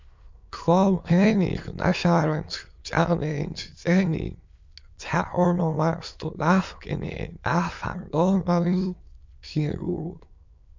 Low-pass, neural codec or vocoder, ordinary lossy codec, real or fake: 7.2 kHz; autoencoder, 22.05 kHz, a latent of 192 numbers a frame, VITS, trained on many speakers; MP3, 64 kbps; fake